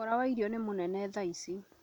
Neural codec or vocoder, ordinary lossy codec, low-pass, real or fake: none; none; 19.8 kHz; real